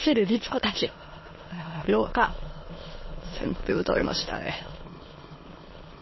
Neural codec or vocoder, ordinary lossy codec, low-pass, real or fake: autoencoder, 22.05 kHz, a latent of 192 numbers a frame, VITS, trained on many speakers; MP3, 24 kbps; 7.2 kHz; fake